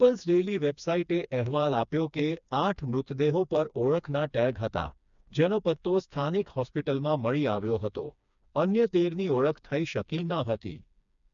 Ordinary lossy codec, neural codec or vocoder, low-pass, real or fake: none; codec, 16 kHz, 2 kbps, FreqCodec, smaller model; 7.2 kHz; fake